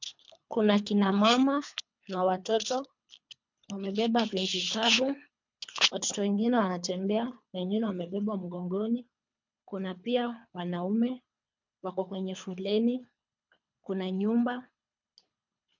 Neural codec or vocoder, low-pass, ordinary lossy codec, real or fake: codec, 24 kHz, 3 kbps, HILCodec; 7.2 kHz; MP3, 64 kbps; fake